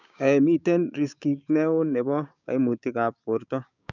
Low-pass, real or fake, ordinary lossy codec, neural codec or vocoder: 7.2 kHz; fake; none; codec, 16 kHz, 6 kbps, DAC